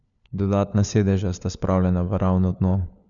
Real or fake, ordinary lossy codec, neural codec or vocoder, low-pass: fake; none; codec, 16 kHz, 4 kbps, FunCodec, trained on LibriTTS, 50 frames a second; 7.2 kHz